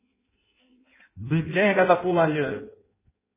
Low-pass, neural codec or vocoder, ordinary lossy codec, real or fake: 3.6 kHz; codec, 16 kHz in and 24 kHz out, 0.6 kbps, FireRedTTS-2 codec; MP3, 16 kbps; fake